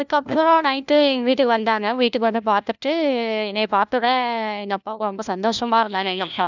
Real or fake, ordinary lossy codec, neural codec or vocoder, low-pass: fake; none; codec, 16 kHz, 1 kbps, FunCodec, trained on LibriTTS, 50 frames a second; 7.2 kHz